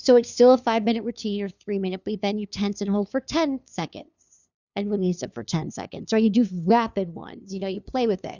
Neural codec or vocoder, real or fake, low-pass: codec, 16 kHz, 2 kbps, FunCodec, trained on LibriTTS, 25 frames a second; fake; 7.2 kHz